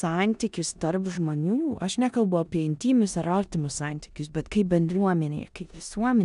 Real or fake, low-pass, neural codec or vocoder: fake; 10.8 kHz; codec, 16 kHz in and 24 kHz out, 0.9 kbps, LongCat-Audio-Codec, four codebook decoder